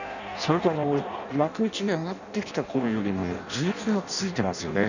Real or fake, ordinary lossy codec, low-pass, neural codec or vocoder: fake; none; 7.2 kHz; codec, 16 kHz in and 24 kHz out, 0.6 kbps, FireRedTTS-2 codec